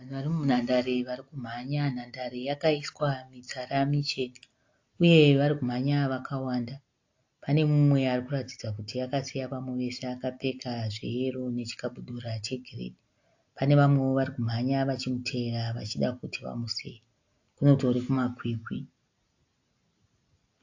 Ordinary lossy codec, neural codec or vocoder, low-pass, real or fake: AAC, 48 kbps; none; 7.2 kHz; real